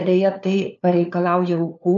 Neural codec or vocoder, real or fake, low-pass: codec, 16 kHz, 4 kbps, FunCodec, trained on LibriTTS, 50 frames a second; fake; 7.2 kHz